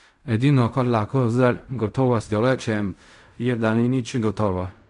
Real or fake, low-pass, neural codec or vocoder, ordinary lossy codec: fake; 10.8 kHz; codec, 16 kHz in and 24 kHz out, 0.4 kbps, LongCat-Audio-Codec, fine tuned four codebook decoder; none